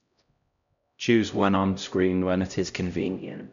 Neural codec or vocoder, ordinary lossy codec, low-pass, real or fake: codec, 16 kHz, 0.5 kbps, X-Codec, HuBERT features, trained on LibriSpeech; none; 7.2 kHz; fake